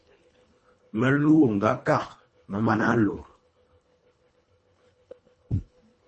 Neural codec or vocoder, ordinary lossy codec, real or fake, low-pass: codec, 24 kHz, 1.5 kbps, HILCodec; MP3, 32 kbps; fake; 10.8 kHz